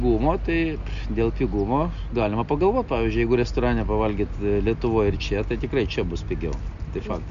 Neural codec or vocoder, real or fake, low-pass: none; real; 7.2 kHz